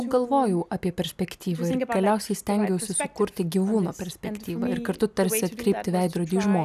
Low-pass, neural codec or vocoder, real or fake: 14.4 kHz; none; real